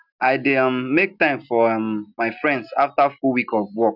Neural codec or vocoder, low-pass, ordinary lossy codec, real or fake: none; 5.4 kHz; none; real